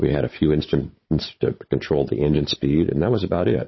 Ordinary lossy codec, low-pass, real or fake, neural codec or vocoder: MP3, 24 kbps; 7.2 kHz; fake; codec, 16 kHz, 16 kbps, FunCodec, trained on Chinese and English, 50 frames a second